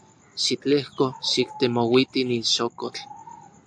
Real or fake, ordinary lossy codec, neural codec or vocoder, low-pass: real; AAC, 64 kbps; none; 9.9 kHz